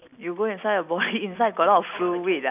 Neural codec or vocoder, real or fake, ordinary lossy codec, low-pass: none; real; none; 3.6 kHz